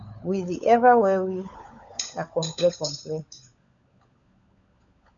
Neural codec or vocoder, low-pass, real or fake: codec, 16 kHz, 16 kbps, FunCodec, trained on LibriTTS, 50 frames a second; 7.2 kHz; fake